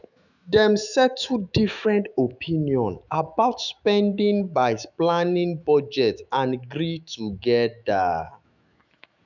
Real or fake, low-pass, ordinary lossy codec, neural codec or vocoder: fake; 7.2 kHz; none; autoencoder, 48 kHz, 128 numbers a frame, DAC-VAE, trained on Japanese speech